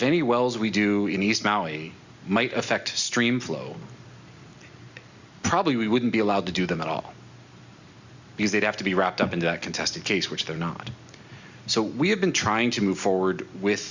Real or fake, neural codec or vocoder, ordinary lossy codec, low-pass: real; none; Opus, 64 kbps; 7.2 kHz